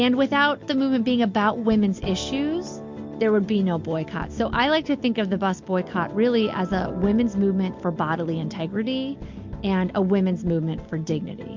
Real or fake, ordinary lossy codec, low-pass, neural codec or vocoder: real; MP3, 48 kbps; 7.2 kHz; none